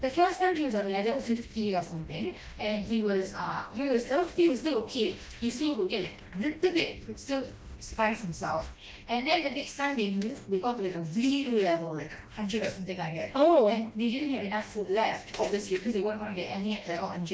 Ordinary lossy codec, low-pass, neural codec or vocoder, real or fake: none; none; codec, 16 kHz, 1 kbps, FreqCodec, smaller model; fake